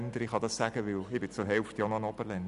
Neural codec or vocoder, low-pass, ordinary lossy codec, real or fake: none; 10.8 kHz; none; real